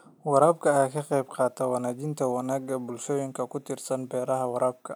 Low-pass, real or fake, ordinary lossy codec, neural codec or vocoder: none; real; none; none